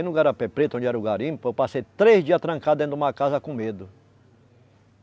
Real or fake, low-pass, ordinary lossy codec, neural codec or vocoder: real; none; none; none